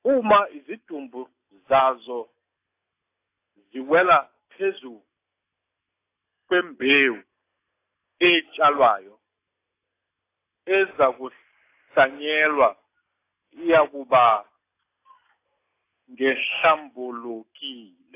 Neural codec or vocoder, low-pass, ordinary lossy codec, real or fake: none; 3.6 kHz; AAC, 24 kbps; real